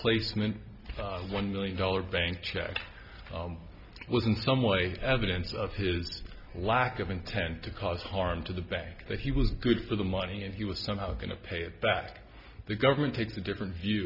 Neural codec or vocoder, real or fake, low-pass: none; real; 5.4 kHz